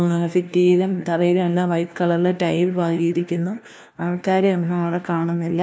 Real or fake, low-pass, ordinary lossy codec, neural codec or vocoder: fake; none; none; codec, 16 kHz, 1 kbps, FunCodec, trained on LibriTTS, 50 frames a second